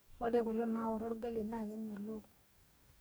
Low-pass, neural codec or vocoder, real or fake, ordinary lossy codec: none; codec, 44.1 kHz, 2.6 kbps, DAC; fake; none